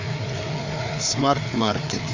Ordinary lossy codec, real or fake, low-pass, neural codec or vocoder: AAC, 48 kbps; fake; 7.2 kHz; codec, 16 kHz, 4 kbps, FreqCodec, larger model